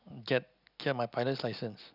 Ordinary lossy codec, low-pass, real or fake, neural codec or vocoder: none; 5.4 kHz; real; none